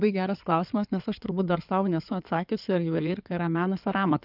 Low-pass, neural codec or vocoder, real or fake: 5.4 kHz; codec, 16 kHz in and 24 kHz out, 2.2 kbps, FireRedTTS-2 codec; fake